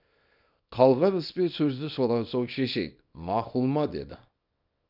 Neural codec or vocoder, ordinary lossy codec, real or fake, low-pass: codec, 24 kHz, 0.9 kbps, WavTokenizer, small release; none; fake; 5.4 kHz